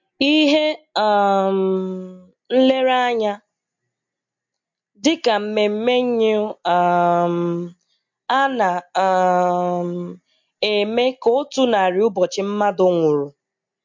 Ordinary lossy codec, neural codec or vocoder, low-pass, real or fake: MP3, 48 kbps; none; 7.2 kHz; real